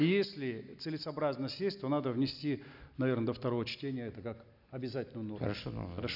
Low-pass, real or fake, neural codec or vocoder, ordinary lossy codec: 5.4 kHz; real; none; none